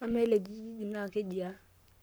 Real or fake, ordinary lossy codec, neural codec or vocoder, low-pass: fake; none; codec, 44.1 kHz, 7.8 kbps, Pupu-Codec; none